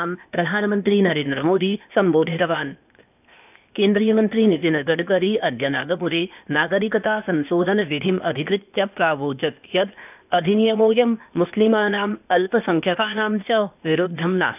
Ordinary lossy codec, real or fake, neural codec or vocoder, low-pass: none; fake; codec, 16 kHz, 0.8 kbps, ZipCodec; 3.6 kHz